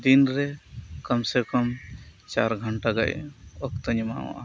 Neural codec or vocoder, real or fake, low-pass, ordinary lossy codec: none; real; none; none